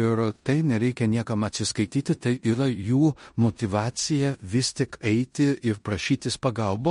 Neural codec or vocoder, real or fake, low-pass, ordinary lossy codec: codec, 16 kHz in and 24 kHz out, 0.9 kbps, LongCat-Audio-Codec, four codebook decoder; fake; 10.8 kHz; MP3, 48 kbps